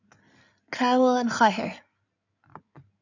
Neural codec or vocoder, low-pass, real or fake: codec, 16 kHz in and 24 kHz out, 2.2 kbps, FireRedTTS-2 codec; 7.2 kHz; fake